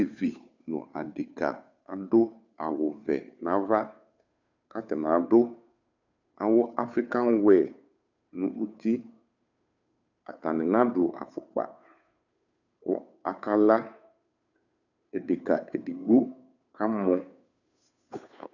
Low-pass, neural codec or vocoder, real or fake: 7.2 kHz; codec, 16 kHz, 2 kbps, FunCodec, trained on Chinese and English, 25 frames a second; fake